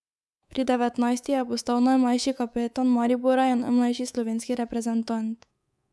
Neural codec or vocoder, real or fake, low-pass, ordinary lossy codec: codec, 24 kHz, 3.1 kbps, DualCodec; fake; none; none